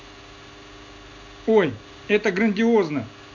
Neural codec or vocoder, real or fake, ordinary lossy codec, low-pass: none; real; none; 7.2 kHz